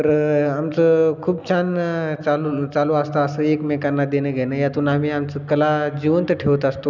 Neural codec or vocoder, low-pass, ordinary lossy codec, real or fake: none; 7.2 kHz; none; real